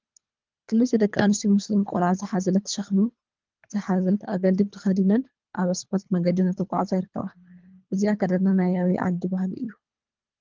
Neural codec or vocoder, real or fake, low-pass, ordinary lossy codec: codec, 24 kHz, 3 kbps, HILCodec; fake; 7.2 kHz; Opus, 32 kbps